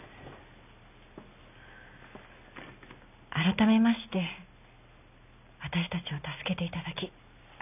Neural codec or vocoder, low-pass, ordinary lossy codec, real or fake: none; 3.6 kHz; none; real